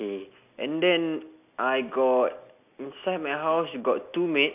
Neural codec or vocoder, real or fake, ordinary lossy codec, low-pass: none; real; none; 3.6 kHz